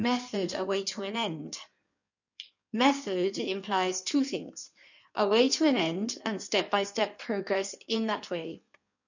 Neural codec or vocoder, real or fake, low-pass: codec, 16 kHz in and 24 kHz out, 1.1 kbps, FireRedTTS-2 codec; fake; 7.2 kHz